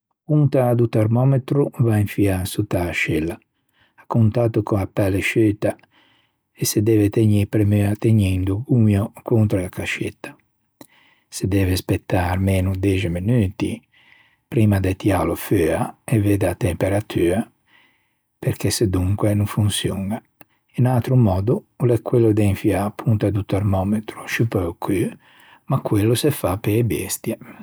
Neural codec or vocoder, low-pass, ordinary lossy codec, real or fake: none; none; none; real